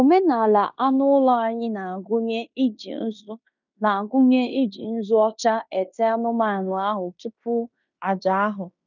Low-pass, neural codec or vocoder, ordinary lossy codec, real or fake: 7.2 kHz; codec, 16 kHz in and 24 kHz out, 0.9 kbps, LongCat-Audio-Codec, fine tuned four codebook decoder; none; fake